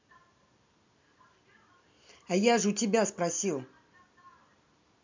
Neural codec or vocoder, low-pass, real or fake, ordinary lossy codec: vocoder, 44.1 kHz, 128 mel bands every 256 samples, BigVGAN v2; 7.2 kHz; fake; MP3, 64 kbps